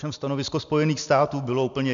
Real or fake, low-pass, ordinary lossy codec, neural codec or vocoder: real; 7.2 kHz; MP3, 96 kbps; none